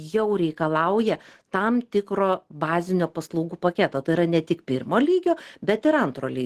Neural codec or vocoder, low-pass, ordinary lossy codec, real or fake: none; 14.4 kHz; Opus, 16 kbps; real